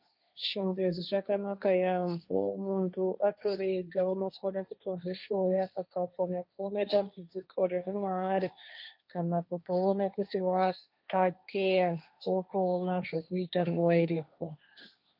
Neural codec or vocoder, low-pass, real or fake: codec, 16 kHz, 1.1 kbps, Voila-Tokenizer; 5.4 kHz; fake